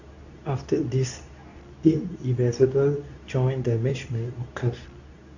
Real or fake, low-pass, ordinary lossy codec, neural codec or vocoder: fake; 7.2 kHz; none; codec, 24 kHz, 0.9 kbps, WavTokenizer, medium speech release version 2